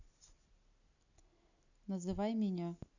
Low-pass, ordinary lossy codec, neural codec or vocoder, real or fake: 7.2 kHz; none; none; real